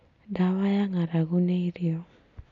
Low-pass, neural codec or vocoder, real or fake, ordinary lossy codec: 7.2 kHz; none; real; none